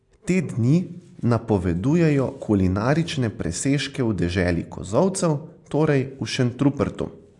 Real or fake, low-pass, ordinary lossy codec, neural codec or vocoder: real; 10.8 kHz; AAC, 64 kbps; none